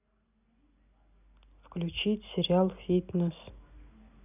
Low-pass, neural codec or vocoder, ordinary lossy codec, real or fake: 3.6 kHz; none; none; real